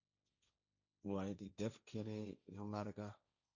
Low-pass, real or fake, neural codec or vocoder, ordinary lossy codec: 7.2 kHz; fake; codec, 16 kHz, 1.1 kbps, Voila-Tokenizer; Opus, 64 kbps